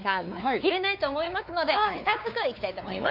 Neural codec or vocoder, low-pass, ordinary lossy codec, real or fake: codec, 16 kHz, 4 kbps, X-Codec, WavLM features, trained on Multilingual LibriSpeech; 5.4 kHz; AAC, 48 kbps; fake